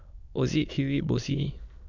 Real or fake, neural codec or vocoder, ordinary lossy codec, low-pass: fake; autoencoder, 22.05 kHz, a latent of 192 numbers a frame, VITS, trained on many speakers; none; 7.2 kHz